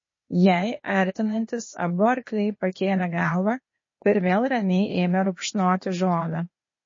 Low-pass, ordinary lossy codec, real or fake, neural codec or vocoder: 7.2 kHz; MP3, 32 kbps; fake; codec, 16 kHz, 0.8 kbps, ZipCodec